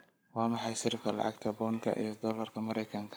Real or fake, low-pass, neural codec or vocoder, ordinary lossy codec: fake; none; codec, 44.1 kHz, 7.8 kbps, Pupu-Codec; none